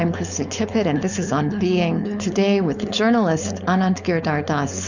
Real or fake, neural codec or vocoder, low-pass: fake; codec, 16 kHz, 4.8 kbps, FACodec; 7.2 kHz